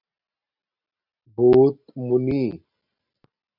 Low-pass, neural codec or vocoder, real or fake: 5.4 kHz; none; real